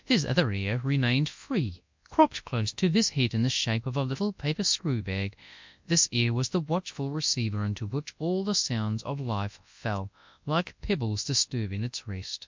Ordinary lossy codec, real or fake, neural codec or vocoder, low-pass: MP3, 64 kbps; fake; codec, 24 kHz, 0.9 kbps, WavTokenizer, large speech release; 7.2 kHz